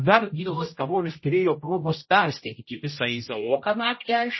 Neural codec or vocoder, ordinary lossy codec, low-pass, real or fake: codec, 16 kHz, 0.5 kbps, X-Codec, HuBERT features, trained on general audio; MP3, 24 kbps; 7.2 kHz; fake